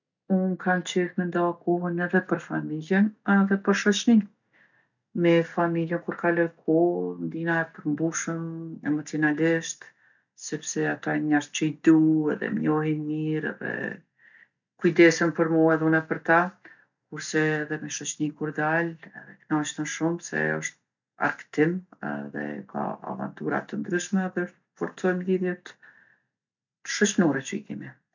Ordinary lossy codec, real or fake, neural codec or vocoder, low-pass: none; real; none; 7.2 kHz